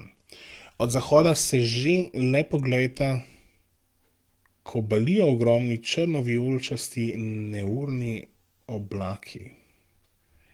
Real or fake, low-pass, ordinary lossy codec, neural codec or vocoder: fake; 19.8 kHz; Opus, 24 kbps; codec, 44.1 kHz, 7.8 kbps, DAC